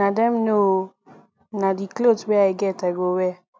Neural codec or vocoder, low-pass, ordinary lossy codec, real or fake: none; none; none; real